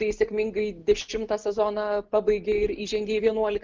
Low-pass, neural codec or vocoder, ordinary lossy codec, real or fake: 7.2 kHz; none; Opus, 32 kbps; real